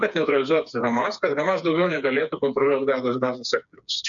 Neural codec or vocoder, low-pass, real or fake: codec, 16 kHz, 4 kbps, FreqCodec, smaller model; 7.2 kHz; fake